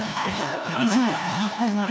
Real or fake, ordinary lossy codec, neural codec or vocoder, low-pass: fake; none; codec, 16 kHz, 1 kbps, FunCodec, trained on LibriTTS, 50 frames a second; none